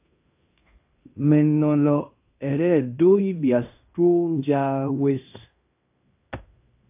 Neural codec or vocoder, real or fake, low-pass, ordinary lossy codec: codec, 16 kHz in and 24 kHz out, 0.9 kbps, LongCat-Audio-Codec, fine tuned four codebook decoder; fake; 3.6 kHz; AAC, 32 kbps